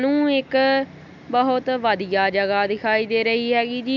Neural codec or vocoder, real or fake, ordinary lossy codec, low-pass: none; real; none; 7.2 kHz